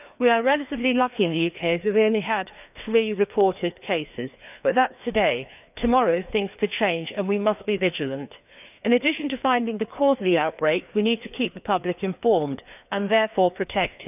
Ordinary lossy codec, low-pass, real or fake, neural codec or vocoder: none; 3.6 kHz; fake; codec, 16 kHz, 2 kbps, FreqCodec, larger model